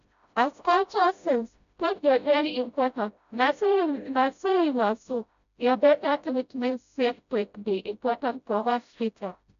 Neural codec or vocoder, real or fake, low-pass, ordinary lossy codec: codec, 16 kHz, 0.5 kbps, FreqCodec, smaller model; fake; 7.2 kHz; none